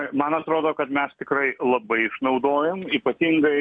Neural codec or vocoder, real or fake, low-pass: none; real; 9.9 kHz